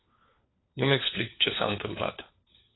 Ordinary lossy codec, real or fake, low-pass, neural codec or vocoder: AAC, 16 kbps; fake; 7.2 kHz; codec, 16 kHz, 4 kbps, FunCodec, trained on LibriTTS, 50 frames a second